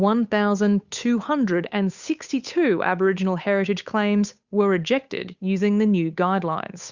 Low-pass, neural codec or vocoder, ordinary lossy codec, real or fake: 7.2 kHz; codec, 16 kHz, 8 kbps, FunCodec, trained on Chinese and English, 25 frames a second; Opus, 64 kbps; fake